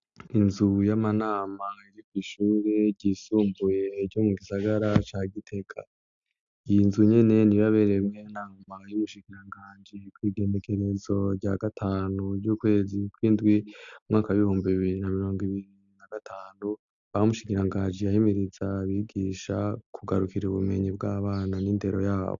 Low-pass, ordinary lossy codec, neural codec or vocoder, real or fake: 7.2 kHz; AAC, 64 kbps; none; real